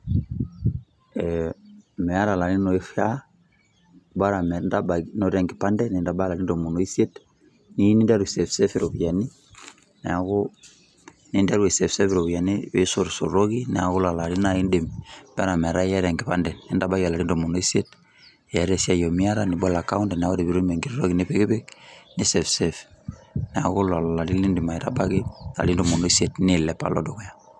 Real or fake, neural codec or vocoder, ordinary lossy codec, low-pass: real; none; none; none